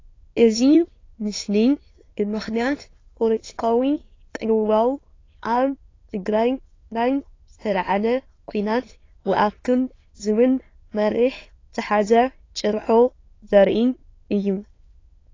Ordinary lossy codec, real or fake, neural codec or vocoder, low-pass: AAC, 32 kbps; fake; autoencoder, 22.05 kHz, a latent of 192 numbers a frame, VITS, trained on many speakers; 7.2 kHz